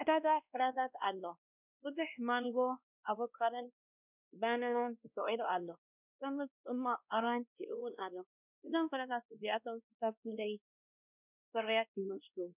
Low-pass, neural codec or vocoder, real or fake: 3.6 kHz; codec, 16 kHz, 1 kbps, X-Codec, WavLM features, trained on Multilingual LibriSpeech; fake